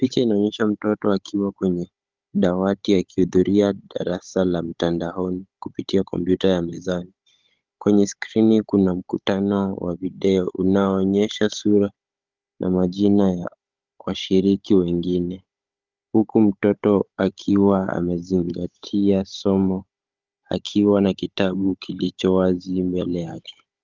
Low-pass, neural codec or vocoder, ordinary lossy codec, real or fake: 7.2 kHz; codec, 16 kHz, 16 kbps, FunCodec, trained on Chinese and English, 50 frames a second; Opus, 32 kbps; fake